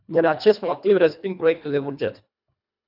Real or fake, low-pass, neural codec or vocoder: fake; 5.4 kHz; codec, 24 kHz, 1.5 kbps, HILCodec